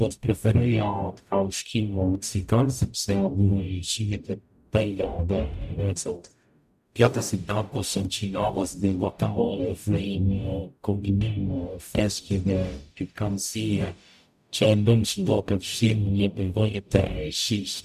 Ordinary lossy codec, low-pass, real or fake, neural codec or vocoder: none; 14.4 kHz; fake; codec, 44.1 kHz, 0.9 kbps, DAC